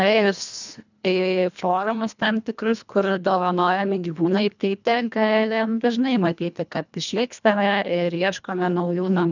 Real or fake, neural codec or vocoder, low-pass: fake; codec, 24 kHz, 1.5 kbps, HILCodec; 7.2 kHz